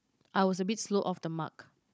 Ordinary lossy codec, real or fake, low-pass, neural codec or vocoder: none; fake; none; codec, 16 kHz, 16 kbps, FunCodec, trained on Chinese and English, 50 frames a second